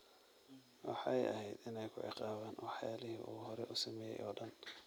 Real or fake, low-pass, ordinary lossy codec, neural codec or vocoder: real; none; none; none